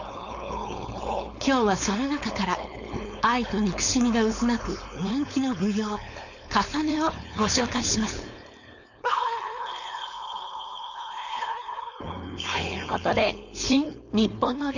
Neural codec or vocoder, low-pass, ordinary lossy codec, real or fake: codec, 16 kHz, 4.8 kbps, FACodec; 7.2 kHz; none; fake